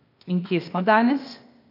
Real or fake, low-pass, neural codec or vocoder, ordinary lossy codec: fake; 5.4 kHz; codec, 16 kHz, 0.8 kbps, ZipCodec; AAC, 48 kbps